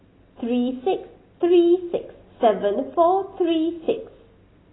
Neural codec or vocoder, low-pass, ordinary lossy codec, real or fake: none; 7.2 kHz; AAC, 16 kbps; real